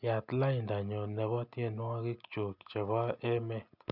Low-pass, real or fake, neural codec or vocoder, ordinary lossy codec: 5.4 kHz; real; none; none